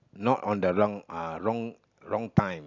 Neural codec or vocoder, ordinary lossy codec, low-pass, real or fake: none; none; 7.2 kHz; real